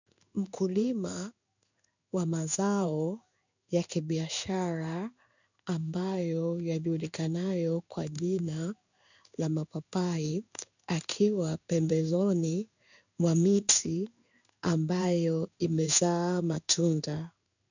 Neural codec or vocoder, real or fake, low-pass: codec, 16 kHz in and 24 kHz out, 1 kbps, XY-Tokenizer; fake; 7.2 kHz